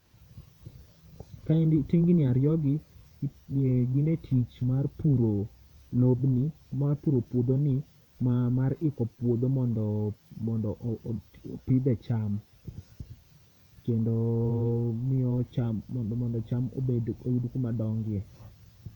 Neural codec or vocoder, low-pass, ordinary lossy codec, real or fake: vocoder, 48 kHz, 128 mel bands, Vocos; 19.8 kHz; none; fake